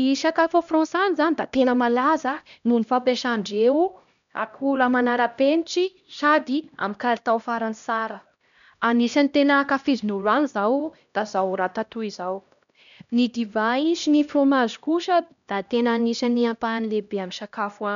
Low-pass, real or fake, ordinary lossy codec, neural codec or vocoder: 7.2 kHz; fake; none; codec, 16 kHz, 1 kbps, X-Codec, HuBERT features, trained on LibriSpeech